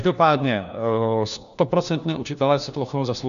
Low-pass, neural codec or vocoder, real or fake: 7.2 kHz; codec, 16 kHz, 1 kbps, FunCodec, trained on LibriTTS, 50 frames a second; fake